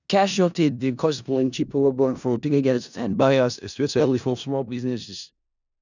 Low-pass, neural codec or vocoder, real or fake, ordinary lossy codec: 7.2 kHz; codec, 16 kHz in and 24 kHz out, 0.4 kbps, LongCat-Audio-Codec, four codebook decoder; fake; none